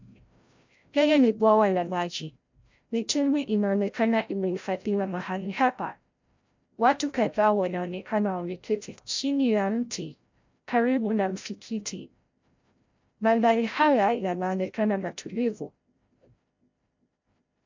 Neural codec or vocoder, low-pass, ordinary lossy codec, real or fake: codec, 16 kHz, 0.5 kbps, FreqCodec, larger model; 7.2 kHz; MP3, 64 kbps; fake